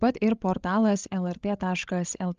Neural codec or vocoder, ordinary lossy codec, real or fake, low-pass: codec, 16 kHz, 16 kbps, FreqCodec, larger model; Opus, 32 kbps; fake; 7.2 kHz